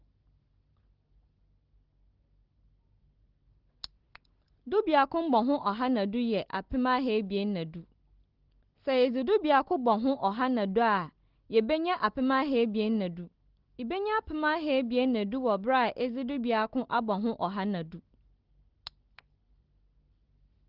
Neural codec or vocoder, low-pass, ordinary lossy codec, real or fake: none; 5.4 kHz; Opus, 16 kbps; real